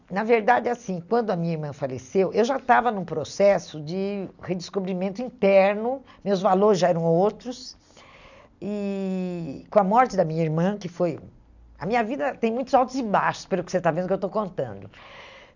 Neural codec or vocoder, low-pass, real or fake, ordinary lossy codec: none; 7.2 kHz; real; none